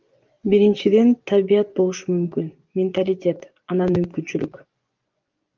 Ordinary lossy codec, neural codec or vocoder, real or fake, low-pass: Opus, 32 kbps; vocoder, 24 kHz, 100 mel bands, Vocos; fake; 7.2 kHz